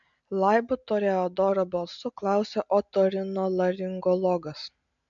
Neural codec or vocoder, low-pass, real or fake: none; 7.2 kHz; real